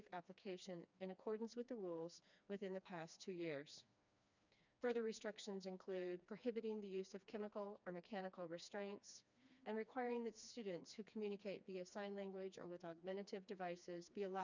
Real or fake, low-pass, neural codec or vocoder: fake; 7.2 kHz; codec, 16 kHz, 2 kbps, FreqCodec, smaller model